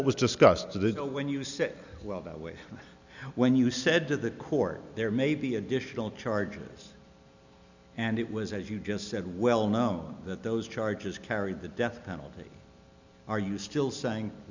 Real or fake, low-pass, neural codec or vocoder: real; 7.2 kHz; none